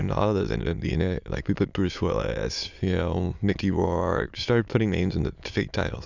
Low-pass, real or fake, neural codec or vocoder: 7.2 kHz; fake; autoencoder, 22.05 kHz, a latent of 192 numbers a frame, VITS, trained on many speakers